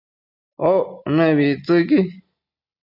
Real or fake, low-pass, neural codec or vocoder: real; 5.4 kHz; none